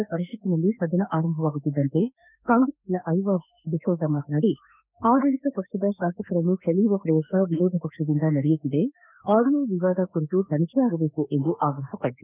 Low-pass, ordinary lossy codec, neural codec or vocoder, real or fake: 3.6 kHz; none; autoencoder, 48 kHz, 32 numbers a frame, DAC-VAE, trained on Japanese speech; fake